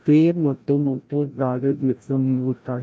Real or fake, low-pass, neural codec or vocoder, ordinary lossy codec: fake; none; codec, 16 kHz, 0.5 kbps, FreqCodec, larger model; none